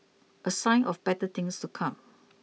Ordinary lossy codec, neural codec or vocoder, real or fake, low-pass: none; none; real; none